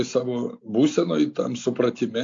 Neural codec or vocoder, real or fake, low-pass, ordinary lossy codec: none; real; 7.2 kHz; AAC, 48 kbps